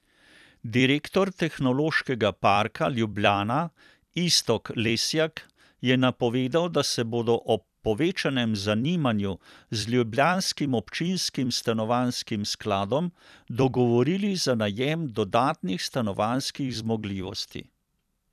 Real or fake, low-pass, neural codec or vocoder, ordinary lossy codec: fake; 14.4 kHz; vocoder, 44.1 kHz, 128 mel bands every 256 samples, BigVGAN v2; none